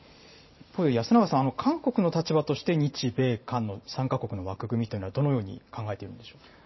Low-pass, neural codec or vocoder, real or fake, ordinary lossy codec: 7.2 kHz; vocoder, 44.1 kHz, 128 mel bands every 512 samples, BigVGAN v2; fake; MP3, 24 kbps